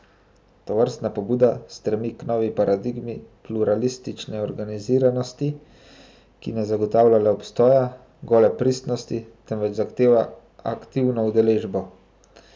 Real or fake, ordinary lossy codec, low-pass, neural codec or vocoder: real; none; none; none